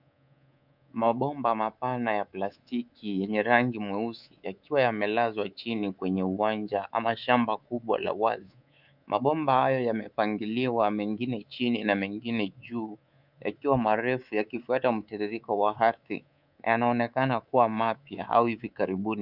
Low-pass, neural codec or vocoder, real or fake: 5.4 kHz; codec, 24 kHz, 3.1 kbps, DualCodec; fake